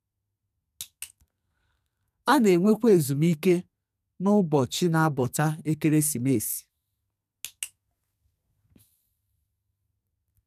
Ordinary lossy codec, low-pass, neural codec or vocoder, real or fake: none; 14.4 kHz; codec, 44.1 kHz, 2.6 kbps, SNAC; fake